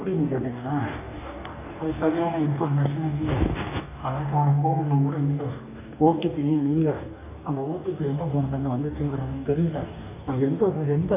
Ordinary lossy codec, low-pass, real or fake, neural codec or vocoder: none; 3.6 kHz; fake; codec, 44.1 kHz, 2.6 kbps, DAC